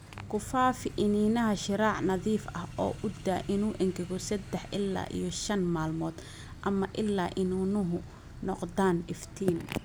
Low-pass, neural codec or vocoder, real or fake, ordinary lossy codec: none; none; real; none